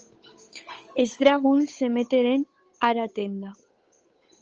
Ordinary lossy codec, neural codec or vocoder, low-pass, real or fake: Opus, 24 kbps; codec, 16 kHz, 8 kbps, FunCodec, trained on Chinese and English, 25 frames a second; 7.2 kHz; fake